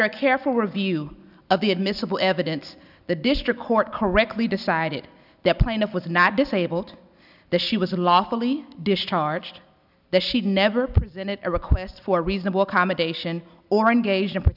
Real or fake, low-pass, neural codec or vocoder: real; 5.4 kHz; none